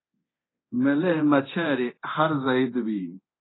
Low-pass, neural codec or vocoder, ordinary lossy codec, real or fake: 7.2 kHz; codec, 24 kHz, 0.9 kbps, DualCodec; AAC, 16 kbps; fake